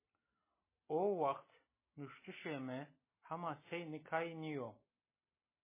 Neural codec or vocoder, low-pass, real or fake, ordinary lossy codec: none; 3.6 kHz; real; MP3, 16 kbps